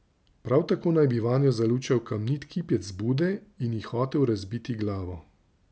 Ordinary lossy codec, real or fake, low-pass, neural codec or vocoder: none; real; none; none